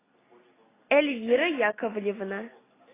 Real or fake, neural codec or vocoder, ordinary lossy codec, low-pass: real; none; AAC, 16 kbps; 3.6 kHz